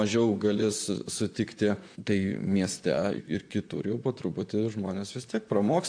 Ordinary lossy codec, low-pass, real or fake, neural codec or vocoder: AAC, 64 kbps; 9.9 kHz; fake; vocoder, 44.1 kHz, 128 mel bands, Pupu-Vocoder